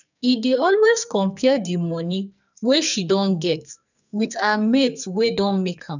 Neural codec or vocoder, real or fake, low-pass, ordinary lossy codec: codec, 44.1 kHz, 2.6 kbps, SNAC; fake; 7.2 kHz; none